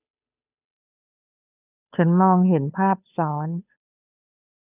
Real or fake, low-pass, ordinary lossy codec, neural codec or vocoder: fake; 3.6 kHz; none; codec, 16 kHz, 2 kbps, FunCodec, trained on Chinese and English, 25 frames a second